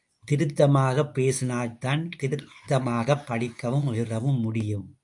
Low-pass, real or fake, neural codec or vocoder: 10.8 kHz; fake; codec, 24 kHz, 0.9 kbps, WavTokenizer, medium speech release version 2